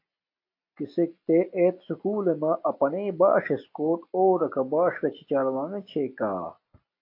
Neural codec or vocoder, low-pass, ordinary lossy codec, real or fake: none; 5.4 kHz; AAC, 32 kbps; real